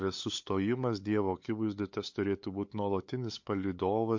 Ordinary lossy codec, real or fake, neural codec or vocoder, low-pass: MP3, 48 kbps; fake; codec, 16 kHz, 8 kbps, FunCodec, trained on LibriTTS, 25 frames a second; 7.2 kHz